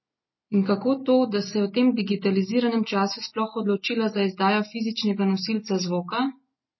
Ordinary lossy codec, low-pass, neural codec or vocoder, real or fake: MP3, 24 kbps; 7.2 kHz; none; real